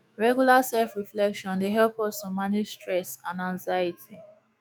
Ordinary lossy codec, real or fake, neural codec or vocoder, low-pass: none; fake; autoencoder, 48 kHz, 128 numbers a frame, DAC-VAE, trained on Japanese speech; none